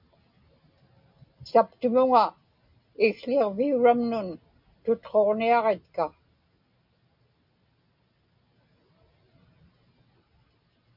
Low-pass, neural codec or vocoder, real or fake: 5.4 kHz; none; real